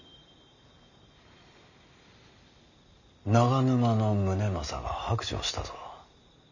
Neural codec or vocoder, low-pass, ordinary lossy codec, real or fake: none; 7.2 kHz; none; real